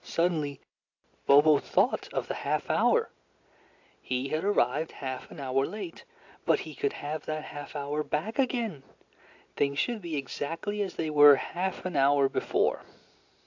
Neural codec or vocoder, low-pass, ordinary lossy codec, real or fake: none; 7.2 kHz; AAC, 48 kbps; real